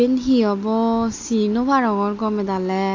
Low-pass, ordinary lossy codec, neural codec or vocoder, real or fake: 7.2 kHz; AAC, 48 kbps; none; real